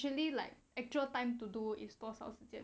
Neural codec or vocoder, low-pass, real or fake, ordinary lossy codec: none; none; real; none